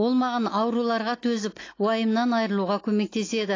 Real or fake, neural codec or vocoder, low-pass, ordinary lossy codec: real; none; 7.2 kHz; AAC, 32 kbps